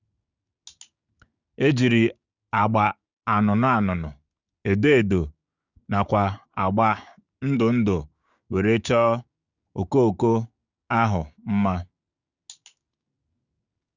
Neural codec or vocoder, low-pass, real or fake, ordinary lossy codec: codec, 16 kHz, 6 kbps, DAC; 7.2 kHz; fake; Opus, 64 kbps